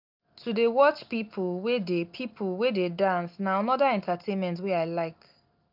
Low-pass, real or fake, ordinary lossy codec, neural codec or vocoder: 5.4 kHz; real; none; none